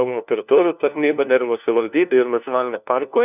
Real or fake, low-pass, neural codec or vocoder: fake; 3.6 kHz; codec, 16 kHz, 1 kbps, FunCodec, trained on LibriTTS, 50 frames a second